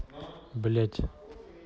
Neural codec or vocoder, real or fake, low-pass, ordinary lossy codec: none; real; none; none